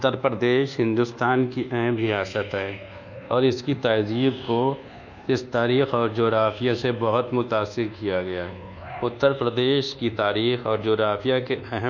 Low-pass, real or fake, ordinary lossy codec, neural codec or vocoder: 7.2 kHz; fake; none; codec, 24 kHz, 1.2 kbps, DualCodec